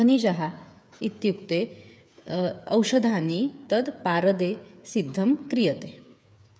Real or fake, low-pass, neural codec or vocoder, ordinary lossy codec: fake; none; codec, 16 kHz, 8 kbps, FreqCodec, smaller model; none